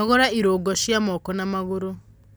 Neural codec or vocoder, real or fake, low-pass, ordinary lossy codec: none; real; none; none